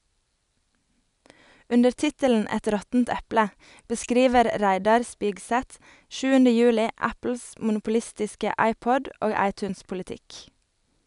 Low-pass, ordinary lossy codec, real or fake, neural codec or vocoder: 10.8 kHz; none; real; none